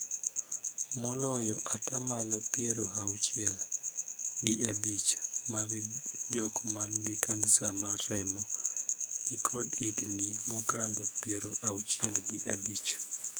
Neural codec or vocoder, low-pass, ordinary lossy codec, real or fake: codec, 44.1 kHz, 2.6 kbps, SNAC; none; none; fake